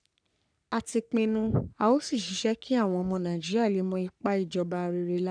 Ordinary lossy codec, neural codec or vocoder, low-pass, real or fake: none; codec, 44.1 kHz, 3.4 kbps, Pupu-Codec; 9.9 kHz; fake